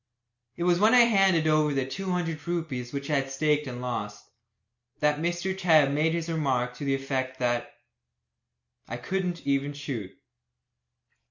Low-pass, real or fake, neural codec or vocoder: 7.2 kHz; real; none